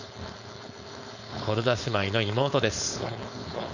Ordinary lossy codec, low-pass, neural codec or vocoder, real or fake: none; 7.2 kHz; codec, 16 kHz, 4.8 kbps, FACodec; fake